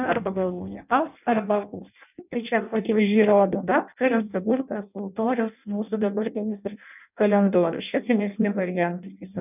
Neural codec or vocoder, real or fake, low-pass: codec, 16 kHz in and 24 kHz out, 0.6 kbps, FireRedTTS-2 codec; fake; 3.6 kHz